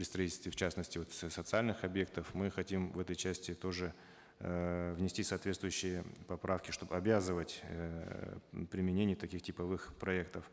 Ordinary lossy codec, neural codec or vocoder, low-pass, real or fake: none; none; none; real